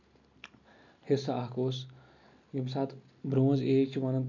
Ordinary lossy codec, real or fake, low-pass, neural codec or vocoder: none; real; 7.2 kHz; none